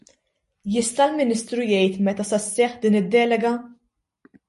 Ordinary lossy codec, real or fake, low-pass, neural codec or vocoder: MP3, 48 kbps; real; 14.4 kHz; none